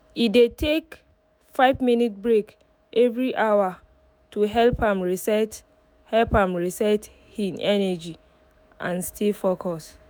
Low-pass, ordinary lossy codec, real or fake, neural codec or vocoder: none; none; fake; autoencoder, 48 kHz, 128 numbers a frame, DAC-VAE, trained on Japanese speech